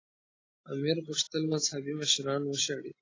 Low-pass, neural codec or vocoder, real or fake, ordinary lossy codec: 7.2 kHz; none; real; AAC, 32 kbps